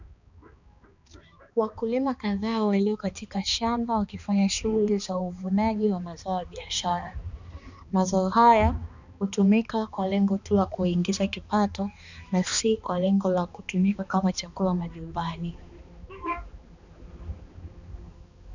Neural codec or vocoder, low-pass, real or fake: codec, 16 kHz, 2 kbps, X-Codec, HuBERT features, trained on balanced general audio; 7.2 kHz; fake